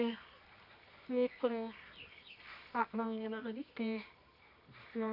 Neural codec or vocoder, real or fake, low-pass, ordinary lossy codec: codec, 24 kHz, 0.9 kbps, WavTokenizer, medium music audio release; fake; 5.4 kHz; none